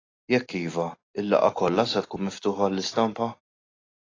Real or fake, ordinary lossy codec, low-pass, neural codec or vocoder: real; AAC, 32 kbps; 7.2 kHz; none